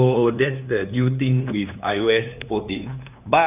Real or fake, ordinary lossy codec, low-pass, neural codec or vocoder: fake; none; 3.6 kHz; codec, 16 kHz, 2 kbps, FunCodec, trained on LibriTTS, 25 frames a second